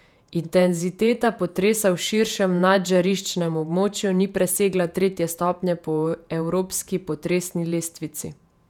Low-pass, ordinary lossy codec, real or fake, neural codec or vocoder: 19.8 kHz; none; fake; vocoder, 48 kHz, 128 mel bands, Vocos